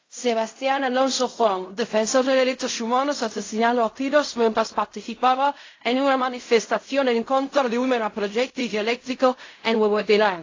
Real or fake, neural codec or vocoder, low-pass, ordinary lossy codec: fake; codec, 16 kHz in and 24 kHz out, 0.4 kbps, LongCat-Audio-Codec, fine tuned four codebook decoder; 7.2 kHz; AAC, 32 kbps